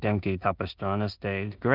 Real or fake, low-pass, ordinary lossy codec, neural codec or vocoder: fake; 5.4 kHz; Opus, 24 kbps; codec, 16 kHz in and 24 kHz out, 0.4 kbps, LongCat-Audio-Codec, two codebook decoder